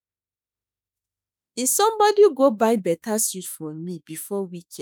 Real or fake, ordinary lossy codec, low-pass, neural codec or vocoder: fake; none; none; autoencoder, 48 kHz, 32 numbers a frame, DAC-VAE, trained on Japanese speech